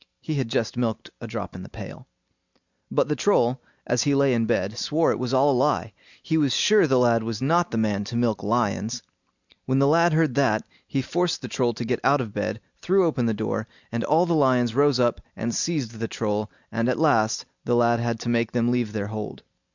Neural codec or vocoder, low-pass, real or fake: none; 7.2 kHz; real